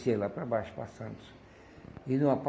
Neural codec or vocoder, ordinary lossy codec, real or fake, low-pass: none; none; real; none